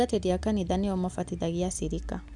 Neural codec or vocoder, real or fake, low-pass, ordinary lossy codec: none; real; 10.8 kHz; none